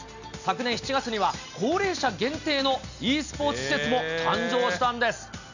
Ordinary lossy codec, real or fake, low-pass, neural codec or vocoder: none; real; 7.2 kHz; none